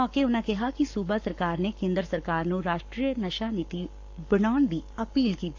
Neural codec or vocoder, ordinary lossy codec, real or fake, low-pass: codec, 44.1 kHz, 7.8 kbps, Pupu-Codec; AAC, 48 kbps; fake; 7.2 kHz